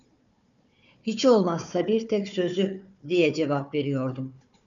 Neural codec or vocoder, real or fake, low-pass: codec, 16 kHz, 4 kbps, FunCodec, trained on Chinese and English, 50 frames a second; fake; 7.2 kHz